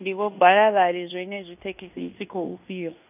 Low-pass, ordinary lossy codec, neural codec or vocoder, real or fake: 3.6 kHz; none; codec, 16 kHz in and 24 kHz out, 0.9 kbps, LongCat-Audio-Codec, fine tuned four codebook decoder; fake